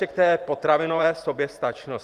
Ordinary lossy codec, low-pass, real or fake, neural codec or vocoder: Opus, 32 kbps; 14.4 kHz; fake; vocoder, 44.1 kHz, 128 mel bands every 512 samples, BigVGAN v2